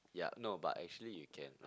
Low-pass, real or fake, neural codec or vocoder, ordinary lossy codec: none; real; none; none